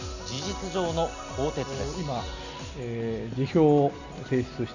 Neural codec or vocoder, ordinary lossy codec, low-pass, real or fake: none; none; 7.2 kHz; real